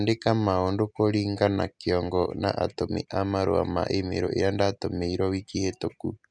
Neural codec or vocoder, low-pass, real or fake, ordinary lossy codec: none; 9.9 kHz; real; none